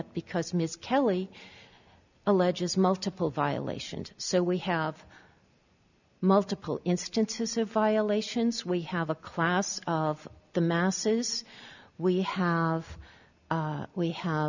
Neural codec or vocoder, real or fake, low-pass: none; real; 7.2 kHz